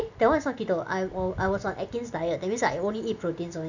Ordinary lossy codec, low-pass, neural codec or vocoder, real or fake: none; 7.2 kHz; none; real